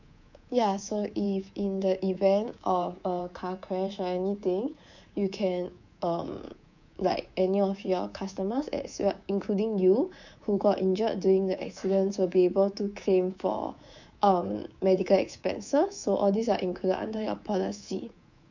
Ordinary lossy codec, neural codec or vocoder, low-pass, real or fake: none; codec, 24 kHz, 3.1 kbps, DualCodec; 7.2 kHz; fake